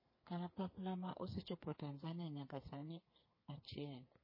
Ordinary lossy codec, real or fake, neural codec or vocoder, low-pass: MP3, 24 kbps; fake; codec, 32 kHz, 1.9 kbps, SNAC; 5.4 kHz